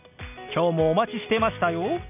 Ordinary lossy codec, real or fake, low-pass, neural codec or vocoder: none; real; 3.6 kHz; none